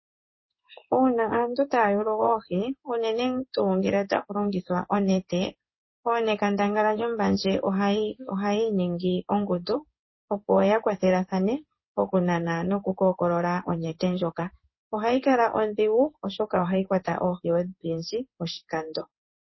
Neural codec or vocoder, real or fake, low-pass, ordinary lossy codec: codec, 16 kHz in and 24 kHz out, 1 kbps, XY-Tokenizer; fake; 7.2 kHz; MP3, 24 kbps